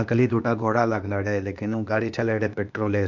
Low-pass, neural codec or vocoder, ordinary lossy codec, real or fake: 7.2 kHz; codec, 16 kHz, 0.8 kbps, ZipCodec; none; fake